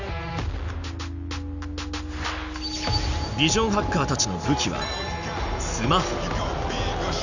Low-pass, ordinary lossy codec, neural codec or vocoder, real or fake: 7.2 kHz; none; none; real